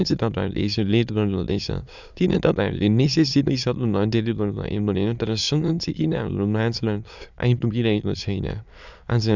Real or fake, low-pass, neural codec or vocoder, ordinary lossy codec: fake; 7.2 kHz; autoencoder, 22.05 kHz, a latent of 192 numbers a frame, VITS, trained on many speakers; none